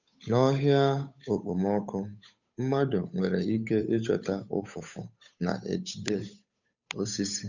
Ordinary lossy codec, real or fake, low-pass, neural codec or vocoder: none; fake; 7.2 kHz; codec, 16 kHz, 8 kbps, FunCodec, trained on Chinese and English, 25 frames a second